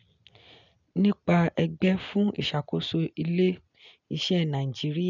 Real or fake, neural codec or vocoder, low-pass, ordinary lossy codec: real; none; 7.2 kHz; none